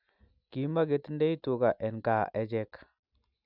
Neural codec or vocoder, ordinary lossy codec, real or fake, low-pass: none; none; real; 5.4 kHz